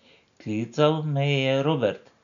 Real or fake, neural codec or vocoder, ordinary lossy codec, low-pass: real; none; none; 7.2 kHz